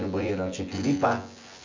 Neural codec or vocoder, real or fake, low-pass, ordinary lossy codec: vocoder, 24 kHz, 100 mel bands, Vocos; fake; 7.2 kHz; MP3, 64 kbps